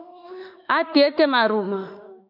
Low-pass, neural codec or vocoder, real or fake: 5.4 kHz; autoencoder, 48 kHz, 32 numbers a frame, DAC-VAE, trained on Japanese speech; fake